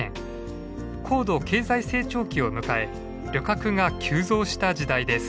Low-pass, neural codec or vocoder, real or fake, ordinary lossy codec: none; none; real; none